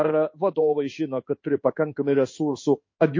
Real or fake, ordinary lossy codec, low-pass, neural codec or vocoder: fake; MP3, 32 kbps; 7.2 kHz; codec, 16 kHz in and 24 kHz out, 1 kbps, XY-Tokenizer